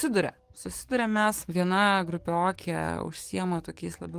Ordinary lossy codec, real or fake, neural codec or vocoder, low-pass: Opus, 24 kbps; fake; codec, 44.1 kHz, 7.8 kbps, DAC; 14.4 kHz